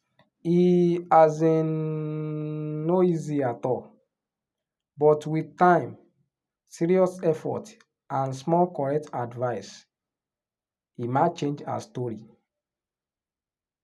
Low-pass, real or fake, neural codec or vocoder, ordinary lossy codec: none; real; none; none